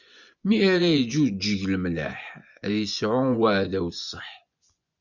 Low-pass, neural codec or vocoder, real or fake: 7.2 kHz; vocoder, 24 kHz, 100 mel bands, Vocos; fake